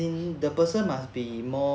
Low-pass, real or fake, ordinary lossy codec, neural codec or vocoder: none; real; none; none